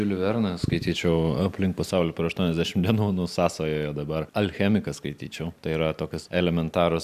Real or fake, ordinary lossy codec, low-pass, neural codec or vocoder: real; AAC, 96 kbps; 14.4 kHz; none